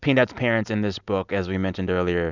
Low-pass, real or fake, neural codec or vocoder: 7.2 kHz; real; none